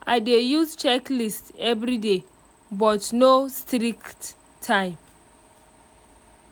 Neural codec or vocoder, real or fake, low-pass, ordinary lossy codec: none; real; none; none